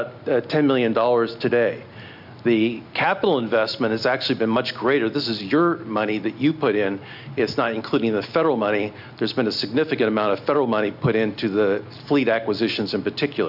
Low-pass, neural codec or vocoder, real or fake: 5.4 kHz; none; real